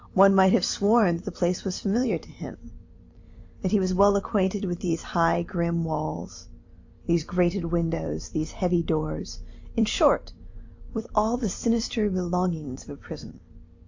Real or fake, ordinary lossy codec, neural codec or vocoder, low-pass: fake; AAC, 48 kbps; vocoder, 44.1 kHz, 128 mel bands every 256 samples, BigVGAN v2; 7.2 kHz